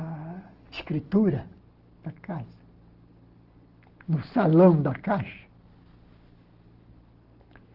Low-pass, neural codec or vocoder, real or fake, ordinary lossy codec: 5.4 kHz; none; real; Opus, 16 kbps